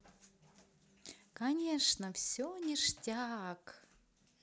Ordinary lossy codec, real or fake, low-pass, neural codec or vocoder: none; real; none; none